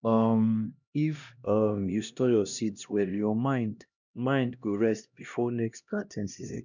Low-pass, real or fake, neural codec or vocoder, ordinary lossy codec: 7.2 kHz; fake; codec, 16 kHz, 1 kbps, X-Codec, HuBERT features, trained on LibriSpeech; none